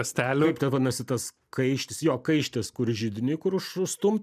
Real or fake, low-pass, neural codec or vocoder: real; 14.4 kHz; none